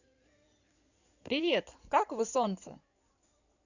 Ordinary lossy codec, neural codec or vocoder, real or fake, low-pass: none; codec, 16 kHz in and 24 kHz out, 2.2 kbps, FireRedTTS-2 codec; fake; 7.2 kHz